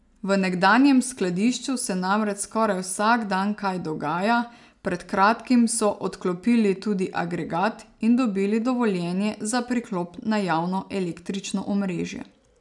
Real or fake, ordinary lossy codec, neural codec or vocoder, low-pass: real; none; none; 10.8 kHz